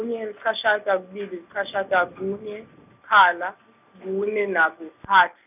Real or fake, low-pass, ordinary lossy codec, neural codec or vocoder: real; 3.6 kHz; none; none